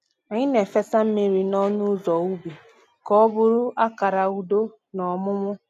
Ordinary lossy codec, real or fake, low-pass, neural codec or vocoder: none; real; 14.4 kHz; none